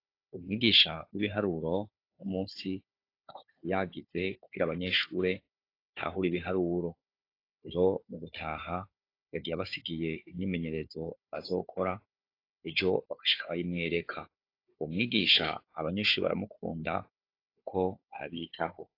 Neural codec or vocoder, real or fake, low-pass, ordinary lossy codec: codec, 16 kHz, 4 kbps, FunCodec, trained on Chinese and English, 50 frames a second; fake; 5.4 kHz; AAC, 32 kbps